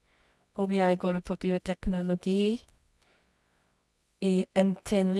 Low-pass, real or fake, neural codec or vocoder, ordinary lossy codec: none; fake; codec, 24 kHz, 0.9 kbps, WavTokenizer, medium music audio release; none